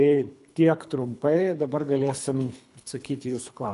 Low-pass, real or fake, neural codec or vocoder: 10.8 kHz; fake; codec, 24 kHz, 3 kbps, HILCodec